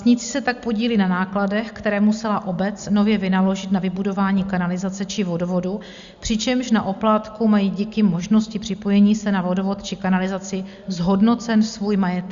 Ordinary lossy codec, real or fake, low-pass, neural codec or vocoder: Opus, 64 kbps; real; 7.2 kHz; none